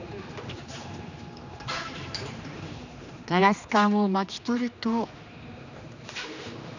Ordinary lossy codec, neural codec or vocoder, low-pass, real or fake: none; codec, 16 kHz, 2 kbps, X-Codec, HuBERT features, trained on general audio; 7.2 kHz; fake